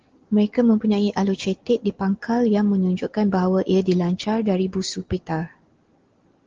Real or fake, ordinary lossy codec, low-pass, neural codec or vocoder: real; Opus, 16 kbps; 7.2 kHz; none